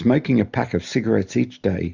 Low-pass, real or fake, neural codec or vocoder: 7.2 kHz; real; none